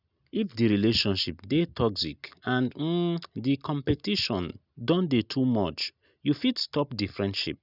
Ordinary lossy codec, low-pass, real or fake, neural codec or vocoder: none; 5.4 kHz; real; none